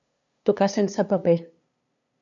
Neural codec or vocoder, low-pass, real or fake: codec, 16 kHz, 2 kbps, FunCodec, trained on LibriTTS, 25 frames a second; 7.2 kHz; fake